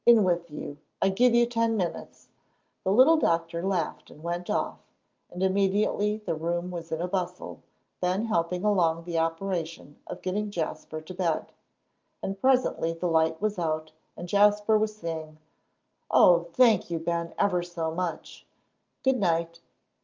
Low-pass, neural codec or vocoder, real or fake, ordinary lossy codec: 7.2 kHz; none; real; Opus, 24 kbps